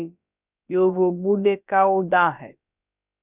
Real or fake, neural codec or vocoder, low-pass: fake; codec, 16 kHz, about 1 kbps, DyCAST, with the encoder's durations; 3.6 kHz